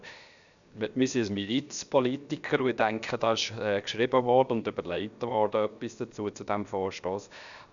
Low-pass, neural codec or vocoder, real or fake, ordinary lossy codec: 7.2 kHz; codec, 16 kHz, 0.7 kbps, FocalCodec; fake; none